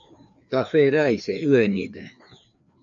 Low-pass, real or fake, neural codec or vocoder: 7.2 kHz; fake; codec, 16 kHz, 2 kbps, FreqCodec, larger model